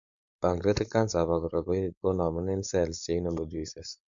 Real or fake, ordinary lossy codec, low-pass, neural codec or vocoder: fake; none; 7.2 kHz; codec, 16 kHz, 4.8 kbps, FACodec